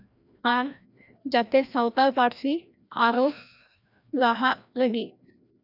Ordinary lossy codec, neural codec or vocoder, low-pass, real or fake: none; codec, 16 kHz, 1 kbps, FreqCodec, larger model; 5.4 kHz; fake